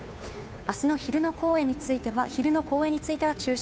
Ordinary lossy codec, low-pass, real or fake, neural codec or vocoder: none; none; fake; codec, 16 kHz, 2 kbps, FunCodec, trained on Chinese and English, 25 frames a second